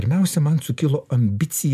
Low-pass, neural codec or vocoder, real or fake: 14.4 kHz; none; real